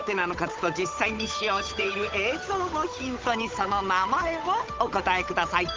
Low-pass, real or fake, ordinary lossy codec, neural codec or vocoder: 7.2 kHz; fake; Opus, 24 kbps; codec, 16 kHz, 8 kbps, FunCodec, trained on Chinese and English, 25 frames a second